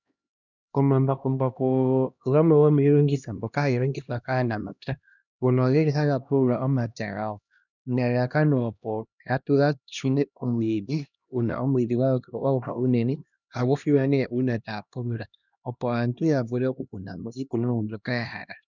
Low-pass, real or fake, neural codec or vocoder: 7.2 kHz; fake; codec, 16 kHz, 1 kbps, X-Codec, HuBERT features, trained on LibriSpeech